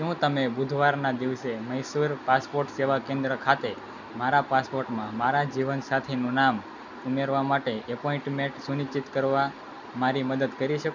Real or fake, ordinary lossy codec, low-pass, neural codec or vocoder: real; none; 7.2 kHz; none